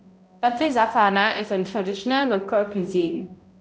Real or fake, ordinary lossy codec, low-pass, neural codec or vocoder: fake; none; none; codec, 16 kHz, 0.5 kbps, X-Codec, HuBERT features, trained on balanced general audio